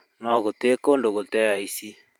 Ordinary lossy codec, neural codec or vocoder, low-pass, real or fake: none; vocoder, 44.1 kHz, 128 mel bands every 512 samples, BigVGAN v2; 19.8 kHz; fake